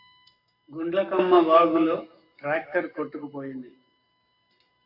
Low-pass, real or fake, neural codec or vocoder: 5.4 kHz; fake; codec, 44.1 kHz, 7.8 kbps, Pupu-Codec